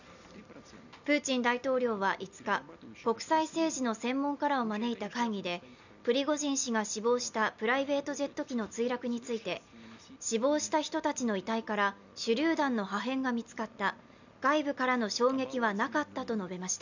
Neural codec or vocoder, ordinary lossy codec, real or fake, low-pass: none; none; real; 7.2 kHz